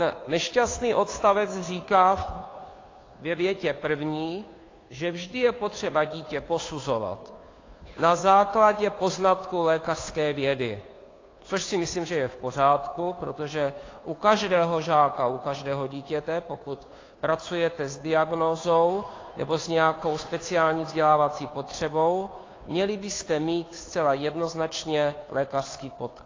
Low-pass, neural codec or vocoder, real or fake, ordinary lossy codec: 7.2 kHz; codec, 16 kHz, 2 kbps, FunCodec, trained on Chinese and English, 25 frames a second; fake; AAC, 32 kbps